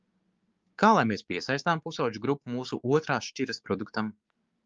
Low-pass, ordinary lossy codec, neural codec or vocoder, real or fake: 7.2 kHz; Opus, 32 kbps; codec, 16 kHz, 6 kbps, DAC; fake